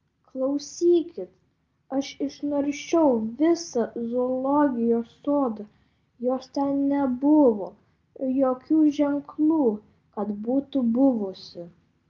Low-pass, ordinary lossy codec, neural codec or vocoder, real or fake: 7.2 kHz; Opus, 24 kbps; none; real